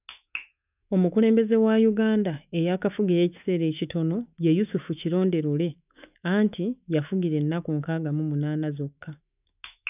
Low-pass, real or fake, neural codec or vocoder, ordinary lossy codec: 3.6 kHz; fake; autoencoder, 48 kHz, 128 numbers a frame, DAC-VAE, trained on Japanese speech; none